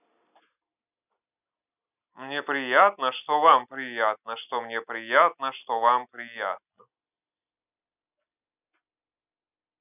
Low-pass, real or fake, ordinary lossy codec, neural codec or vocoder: 3.6 kHz; real; none; none